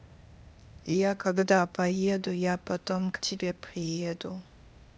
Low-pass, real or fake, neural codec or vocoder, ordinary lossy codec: none; fake; codec, 16 kHz, 0.8 kbps, ZipCodec; none